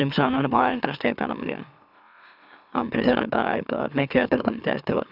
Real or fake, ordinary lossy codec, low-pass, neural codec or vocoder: fake; none; 5.4 kHz; autoencoder, 44.1 kHz, a latent of 192 numbers a frame, MeloTTS